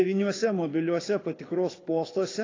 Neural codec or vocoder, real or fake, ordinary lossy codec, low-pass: codec, 24 kHz, 6 kbps, HILCodec; fake; AAC, 32 kbps; 7.2 kHz